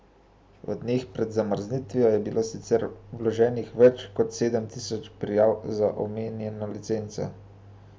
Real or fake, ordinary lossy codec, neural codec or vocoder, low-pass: real; none; none; none